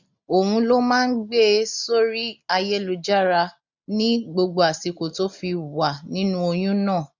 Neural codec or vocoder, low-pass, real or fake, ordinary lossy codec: none; 7.2 kHz; real; none